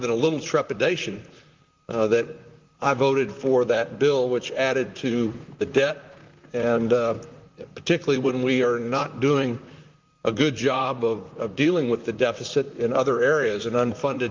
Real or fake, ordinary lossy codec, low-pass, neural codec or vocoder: fake; Opus, 24 kbps; 7.2 kHz; vocoder, 44.1 kHz, 128 mel bands, Pupu-Vocoder